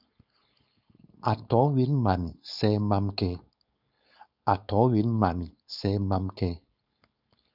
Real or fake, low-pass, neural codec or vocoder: fake; 5.4 kHz; codec, 16 kHz, 4.8 kbps, FACodec